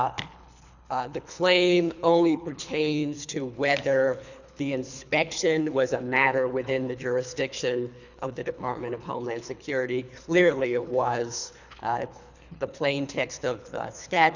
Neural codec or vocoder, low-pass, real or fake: codec, 24 kHz, 3 kbps, HILCodec; 7.2 kHz; fake